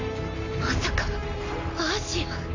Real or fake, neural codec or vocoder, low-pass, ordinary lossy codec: real; none; 7.2 kHz; AAC, 48 kbps